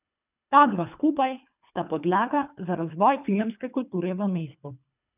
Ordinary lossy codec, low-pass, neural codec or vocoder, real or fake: none; 3.6 kHz; codec, 24 kHz, 3 kbps, HILCodec; fake